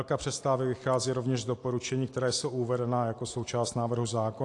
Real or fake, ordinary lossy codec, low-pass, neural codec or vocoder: real; AAC, 48 kbps; 10.8 kHz; none